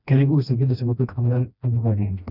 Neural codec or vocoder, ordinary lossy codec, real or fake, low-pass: codec, 16 kHz, 1 kbps, FreqCodec, smaller model; none; fake; 5.4 kHz